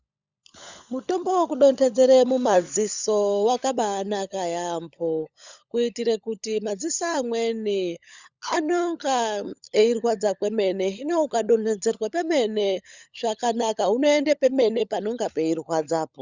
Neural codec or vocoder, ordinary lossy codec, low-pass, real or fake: codec, 16 kHz, 16 kbps, FunCodec, trained on LibriTTS, 50 frames a second; Opus, 64 kbps; 7.2 kHz; fake